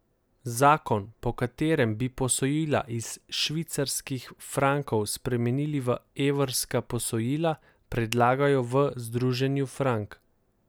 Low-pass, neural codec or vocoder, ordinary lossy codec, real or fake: none; none; none; real